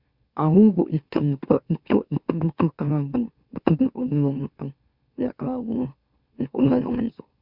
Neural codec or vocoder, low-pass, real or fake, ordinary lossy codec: autoencoder, 44.1 kHz, a latent of 192 numbers a frame, MeloTTS; 5.4 kHz; fake; Opus, 64 kbps